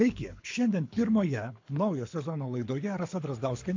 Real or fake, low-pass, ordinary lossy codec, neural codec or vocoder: fake; 7.2 kHz; MP3, 48 kbps; codec, 24 kHz, 6 kbps, HILCodec